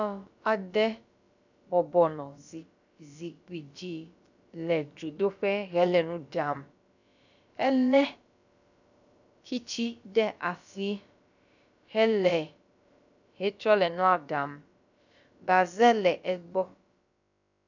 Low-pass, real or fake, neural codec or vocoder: 7.2 kHz; fake; codec, 16 kHz, about 1 kbps, DyCAST, with the encoder's durations